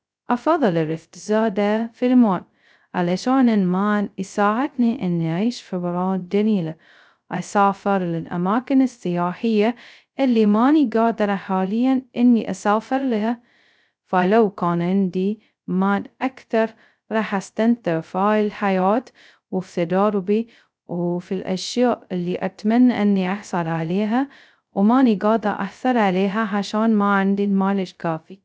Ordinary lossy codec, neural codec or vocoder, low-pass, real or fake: none; codec, 16 kHz, 0.2 kbps, FocalCodec; none; fake